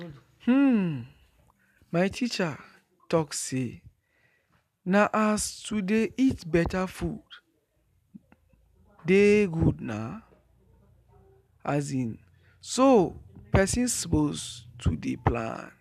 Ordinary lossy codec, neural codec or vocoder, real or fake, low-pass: none; none; real; 14.4 kHz